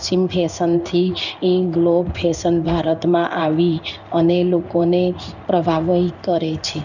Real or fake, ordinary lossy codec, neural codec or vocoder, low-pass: fake; none; codec, 16 kHz in and 24 kHz out, 1 kbps, XY-Tokenizer; 7.2 kHz